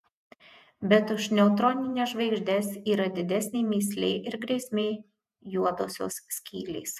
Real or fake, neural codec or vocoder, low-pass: fake; vocoder, 48 kHz, 128 mel bands, Vocos; 14.4 kHz